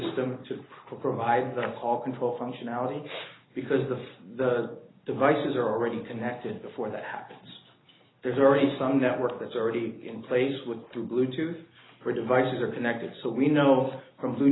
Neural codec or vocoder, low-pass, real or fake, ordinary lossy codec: none; 7.2 kHz; real; AAC, 16 kbps